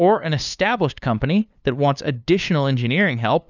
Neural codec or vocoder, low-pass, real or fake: codec, 16 kHz, 2 kbps, FunCodec, trained on LibriTTS, 25 frames a second; 7.2 kHz; fake